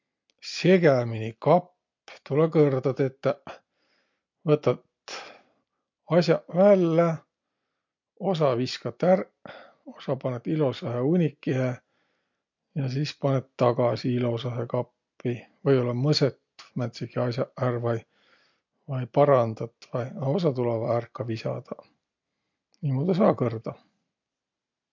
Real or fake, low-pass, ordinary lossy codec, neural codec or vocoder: real; 7.2 kHz; MP3, 48 kbps; none